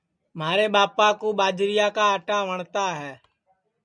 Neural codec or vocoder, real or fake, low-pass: none; real; 9.9 kHz